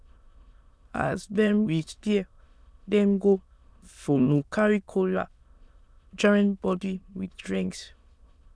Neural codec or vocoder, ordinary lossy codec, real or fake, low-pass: autoencoder, 22.05 kHz, a latent of 192 numbers a frame, VITS, trained on many speakers; none; fake; none